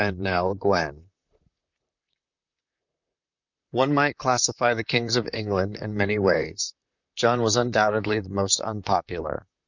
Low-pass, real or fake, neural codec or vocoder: 7.2 kHz; fake; vocoder, 44.1 kHz, 128 mel bands, Pupu-Vocoder